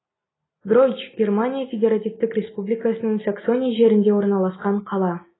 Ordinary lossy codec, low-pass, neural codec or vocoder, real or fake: AAC, 16 kbps; 7.2 kHz; none; real